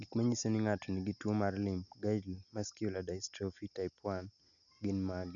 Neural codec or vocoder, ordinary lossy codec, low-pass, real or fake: none; none; 7.2 kHz; real